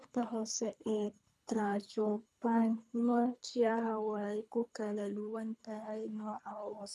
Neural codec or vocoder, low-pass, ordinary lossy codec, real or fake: codec, 24 kHz, 3 kbps, HILCodec; none; none; fake